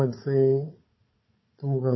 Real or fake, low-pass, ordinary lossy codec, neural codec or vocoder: fake; 7.2 kHz; MP3, 24 kbps; codec, 16 kHz, 4 kbps, FunCodec, trained on Chinese and English, 50 frames a second